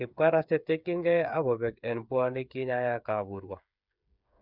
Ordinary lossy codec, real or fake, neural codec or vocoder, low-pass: none; fake; codec, 16 kHz, 8 kbps, FreqCodec, smaller model; 5.4 kHz